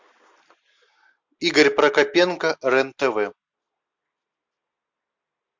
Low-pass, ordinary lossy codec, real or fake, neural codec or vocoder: 7.2 kHz; MP3, 64 kbps; real; none